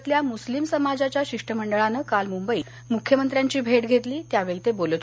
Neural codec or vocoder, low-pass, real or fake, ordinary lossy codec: none; none; real; none